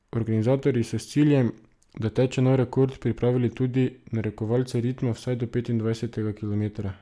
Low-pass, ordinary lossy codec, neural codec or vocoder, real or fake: none; none; none; real